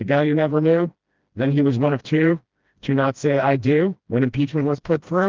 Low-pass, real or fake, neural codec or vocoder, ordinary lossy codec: 7.2 kHz; fake; codec, 16 kHz, 1 kbps, FreqCodec, smaller model; Opus, 32 kbps